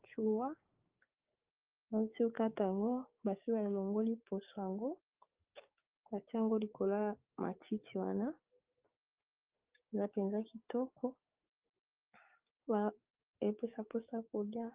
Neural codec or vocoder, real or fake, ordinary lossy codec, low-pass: codec, 16 kHz, 6 kbps, DAC; fake; Opus, 24 kbps; 3.6 kHz